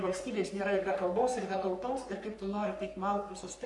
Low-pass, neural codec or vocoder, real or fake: 10.8 kHz; codec, 44.1 kHz, 3.4 kbps, Pupu-Codec; fake